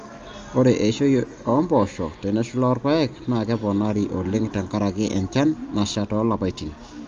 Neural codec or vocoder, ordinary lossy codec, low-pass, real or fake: none; Opus, 32 kbps; 7.2 kHz; real